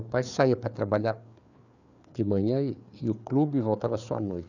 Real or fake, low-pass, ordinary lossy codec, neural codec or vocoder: fake; 7.2 kHz; none; codec, 16 kHz, 4 kbps, FreqCodec, larger model